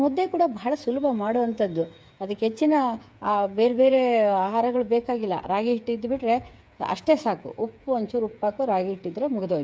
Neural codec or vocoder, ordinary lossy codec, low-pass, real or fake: codec, 16 kHz, 8 kbps, FreqCodec, smaller model; none; none; fake